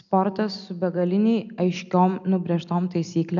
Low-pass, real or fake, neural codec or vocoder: 7.2 kHz; real; none